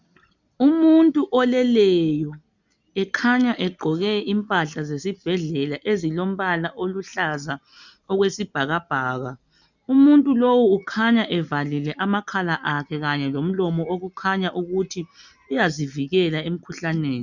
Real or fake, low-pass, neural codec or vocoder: real; 7.2 kHz; none